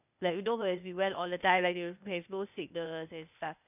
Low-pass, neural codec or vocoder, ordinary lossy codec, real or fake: 3.6 kHz; codec, 16 kHz, 0.8 kbps, ZipCodec; none; fake